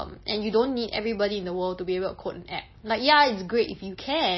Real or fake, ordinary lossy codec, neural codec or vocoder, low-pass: real; MP3, 24 kbps; none; 7.2 kHz